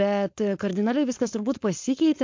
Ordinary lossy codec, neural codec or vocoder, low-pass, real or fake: MP3, 48 kbps; codec, 16 kHz, 4.8 kbps, FACodec; 7.2 kHz; fake